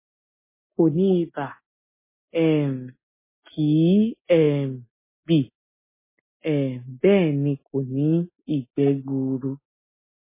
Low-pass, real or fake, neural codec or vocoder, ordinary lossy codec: 3.6 kHz; real; none; MP3, 16 kbps